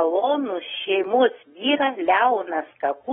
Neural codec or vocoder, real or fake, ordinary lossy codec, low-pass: autoencoder, 48 kHz, 128 numbers a frame, DAC-VAE, trained on Japanese speech; fake; AAC, 16 kbps; 19.8 kHz